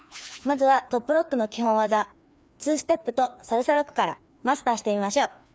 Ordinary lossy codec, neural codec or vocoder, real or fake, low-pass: none; codec, 16 kHz, 2 kbps, FreqCodec, larger model; fake; none